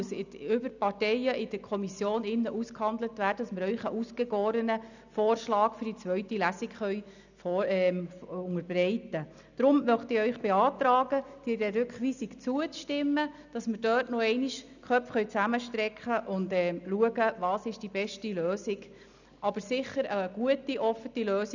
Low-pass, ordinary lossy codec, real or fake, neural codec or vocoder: 7.2 kHz; none; real; none